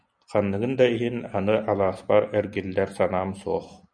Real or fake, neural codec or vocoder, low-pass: real; none; 9.9 kHz